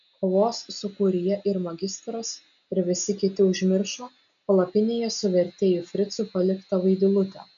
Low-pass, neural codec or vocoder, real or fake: 7.2 kHz; none; real